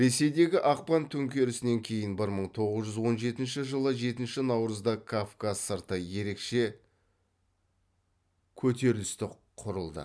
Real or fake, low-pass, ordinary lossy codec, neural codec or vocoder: real; none; none; none